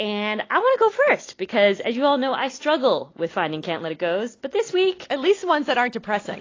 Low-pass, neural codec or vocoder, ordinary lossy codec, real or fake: 7.2 kHz; none; AAC, 32 kbps; real